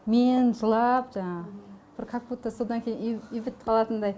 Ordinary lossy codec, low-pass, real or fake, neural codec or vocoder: none; none; real; none